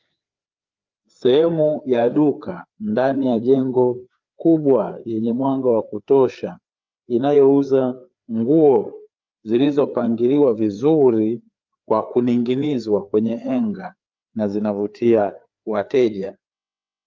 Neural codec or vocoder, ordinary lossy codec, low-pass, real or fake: codec, 16 kHz, 4 kbps, FreqCodec, larger model; Opus, 24 kbps; 7.2 kHz; fake